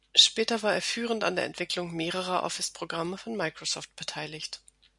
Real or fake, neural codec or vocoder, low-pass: real; none; 10.8 kHz